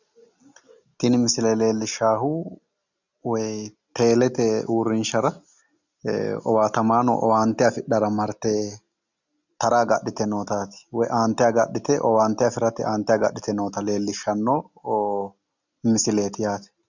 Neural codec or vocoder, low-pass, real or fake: none; 7.2 kHz; real